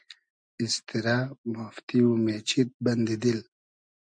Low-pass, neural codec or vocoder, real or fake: 9.9 kHz; none; real